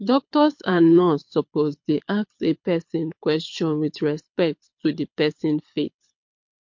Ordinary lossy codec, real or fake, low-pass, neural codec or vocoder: MP3, 48 kbps; fake; 7.2 kHz; codec, 24 kHz, 6 kbps, HILCodec